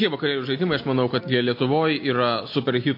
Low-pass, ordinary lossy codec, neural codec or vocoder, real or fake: 5.4 kHz; MP3, 32 kbps; none; real